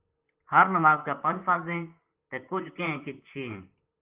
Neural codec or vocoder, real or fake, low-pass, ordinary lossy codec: vocoder, 44.1 kHz, 128 mel bands, Pupu-Vocoder; fake; 3.6 kHz; Opus, 32 kbps